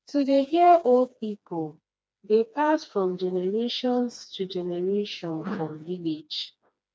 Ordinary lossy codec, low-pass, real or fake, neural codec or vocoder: none; none; fake; codec, 16 kHz, 2 kbps, FreqCodec, smaller model